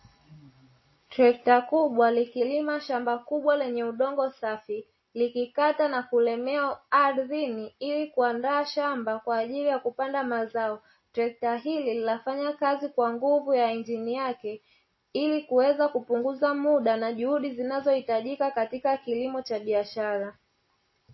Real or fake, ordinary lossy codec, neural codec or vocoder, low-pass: real; MP3, 24 kbps; none; 7.2 kHz